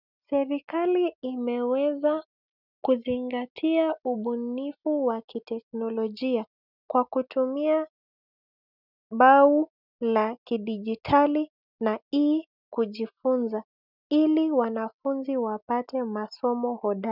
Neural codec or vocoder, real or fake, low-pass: none; real; 5.4 kHz